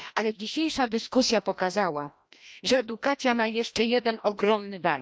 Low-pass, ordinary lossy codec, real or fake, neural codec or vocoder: none; none; fake; codec, 16 kHz, 1 kbps, FreqCodec, larger model